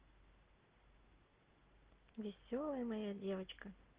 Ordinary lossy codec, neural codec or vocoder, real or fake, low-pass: Opus, 16 kbps; none; real; 3.6 kHz